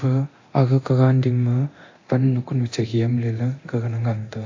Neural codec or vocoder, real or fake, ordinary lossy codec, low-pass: codec, 24 kHz, 0.9 kbps, DualCodec; fake; AAC, 48 kbps; 7.2 kHz